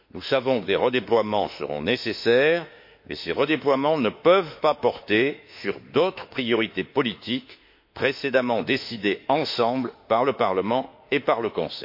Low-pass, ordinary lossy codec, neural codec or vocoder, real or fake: 5.4 kHz; MP3, 32 kbps; autoencoder, 48 kHz, 32 numbers a frame, DAC-VAE, trained on Japanese speech; fake